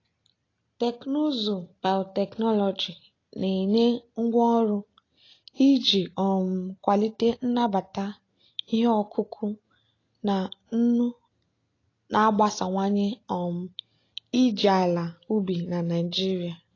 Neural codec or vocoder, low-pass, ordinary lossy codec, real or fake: none; 7.2 kHz; AAC, 32 kbps; real